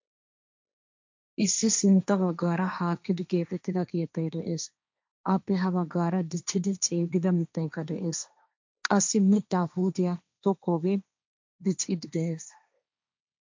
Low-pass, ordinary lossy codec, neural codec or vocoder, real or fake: 7.2 kHz; MP3, 64 kbps; codec, 16 kHz, 1.1 kbps, Voila-Tokenizer; fake